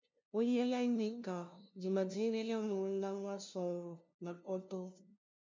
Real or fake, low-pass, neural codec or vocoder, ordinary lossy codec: fake; 7.2 kHz; codec, 16 kHz, 0.5 kbps, FunCodec, trained on LibriTTS, 25 frames a second; MP3, 64 kbps